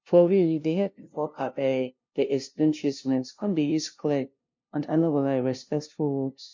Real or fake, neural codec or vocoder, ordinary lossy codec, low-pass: fake; codec, 16 kHz, 0.5 kbps, FunCodec, trained on LibriTTS, 25 frames a second; MP3, 48 kbps; 7.2 kHz